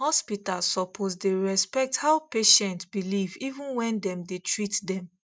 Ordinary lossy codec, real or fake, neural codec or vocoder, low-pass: none; real; none; none